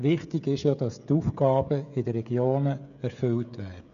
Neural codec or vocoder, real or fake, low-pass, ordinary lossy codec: codec, 16 kHz, 16 kbps, FreqCodec, smaller model; fake; 7.2 kHz; none